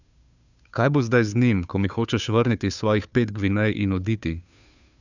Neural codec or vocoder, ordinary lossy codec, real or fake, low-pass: codec, 16 kHz, 2 kbps, FunCodec, trained on Chinese and English, 25 frames a second; none; fake; 7.2 kHz